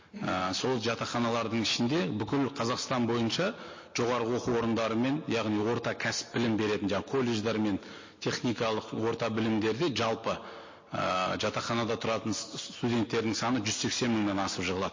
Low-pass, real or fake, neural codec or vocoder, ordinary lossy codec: 7.2 kHz; real; none; MP3, 32 kbps